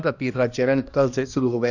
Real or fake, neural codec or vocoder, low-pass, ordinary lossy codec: fake; codec, 16 kHz, 1 kbps, X-Codec, HuBERT features, trained on balanced general audio; 7.2 kHz; none